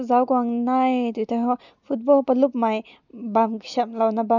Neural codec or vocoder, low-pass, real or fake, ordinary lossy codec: none; 7.2 kHz; real; none